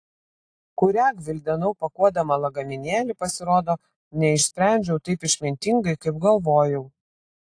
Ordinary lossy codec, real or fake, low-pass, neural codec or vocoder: AAC, 48 kbps; real; 9.9 kHz; none